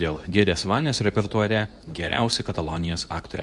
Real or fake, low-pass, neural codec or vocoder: fake; 10.8 kHz; codec, 24 kHz, 0.9 kbps, WavTokenizer, medium speech release version 2